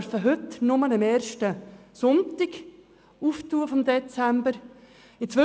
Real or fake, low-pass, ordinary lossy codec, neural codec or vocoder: real; none; none; none